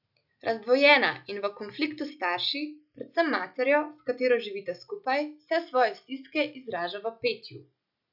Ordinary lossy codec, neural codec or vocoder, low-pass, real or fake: none; none; 5.4 kHz; real